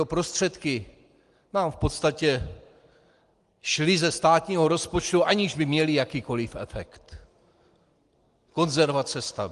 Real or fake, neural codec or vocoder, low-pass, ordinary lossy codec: real; none; 10.8 kHz; Opus, 24 kbps